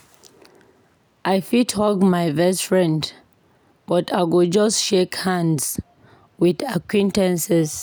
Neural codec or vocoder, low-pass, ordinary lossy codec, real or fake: none; none; none; real